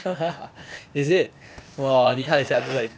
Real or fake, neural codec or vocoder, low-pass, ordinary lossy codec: fake; codec, 16 kHz, 0.8 kbps, ZipCodec; none; none